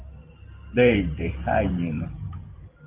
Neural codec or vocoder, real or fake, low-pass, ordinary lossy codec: vocoder, 44.1 kHz, 128 mel bands every 512 samples, BigVGAN v2; fake; 3.6 kHz; Opus, 24 kbps